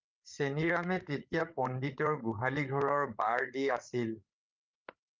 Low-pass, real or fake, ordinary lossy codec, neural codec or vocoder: 7.2 kHz; fake; Opus, 24 kbps; codec, 16 kHz, 8 kbps, FreqCodec, larger model